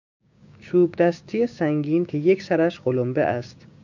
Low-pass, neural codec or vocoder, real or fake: 7.2 kHz; codec, 16 kHz, 6 kbps, DAC; fake